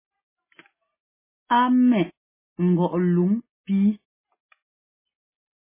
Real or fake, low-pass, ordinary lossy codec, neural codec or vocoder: real; 3.6 kHz; MP3, 16 kbps; none